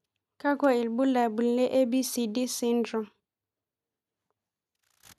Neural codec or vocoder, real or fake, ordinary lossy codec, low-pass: none; real; none; 14.4 kHz